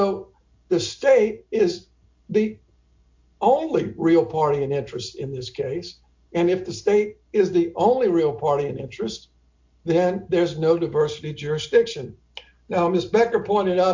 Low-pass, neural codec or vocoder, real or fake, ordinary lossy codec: 7.2 kHz; none; real; MP3, 48 kbps